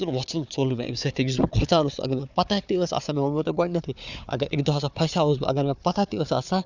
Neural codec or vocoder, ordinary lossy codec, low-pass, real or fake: codec, 16 kHz, 4 kbps, FunCodec, trained on Chinese and English, 50 frames a second; none; 7.2 kHz; fake